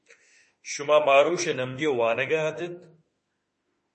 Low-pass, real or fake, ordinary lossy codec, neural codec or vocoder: 10.8 kHz; fake; MP3, 32 kbps; autoencoder, 48 kHz, 32 numbers a frame, DAC-VAE, trained on Japanese speech